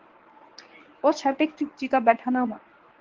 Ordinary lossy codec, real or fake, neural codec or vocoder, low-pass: Opus, 16 kbps; fake; codec, 24 kHz, 0.9 kbps, WavTokenizer, medium speech release version 1; 7.2 kHz